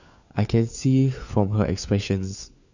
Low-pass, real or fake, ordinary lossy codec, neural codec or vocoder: 7.2 kHz; fake; none; codec, 16 kHz, 4 kbps, FunCodec, trained on LibriTTS, 50 frames a second